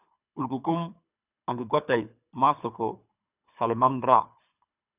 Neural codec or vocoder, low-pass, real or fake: codec, 24 kHz, 3 kbps, HILCodec; 3.6 kHz; fake